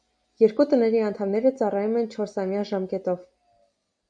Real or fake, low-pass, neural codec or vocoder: real; 9.9 kHz; none